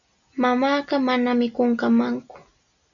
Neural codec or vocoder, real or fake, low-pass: none; real; 7.2 kHz